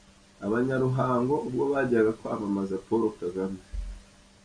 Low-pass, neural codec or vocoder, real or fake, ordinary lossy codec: 9.9 kHz; none; real; AAC, 64 kbps